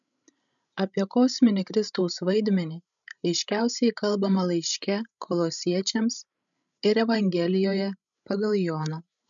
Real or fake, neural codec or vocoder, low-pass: fake; codec, 16 kHz, 16 kbps, FreqCodec, larger model; 7.2 kHz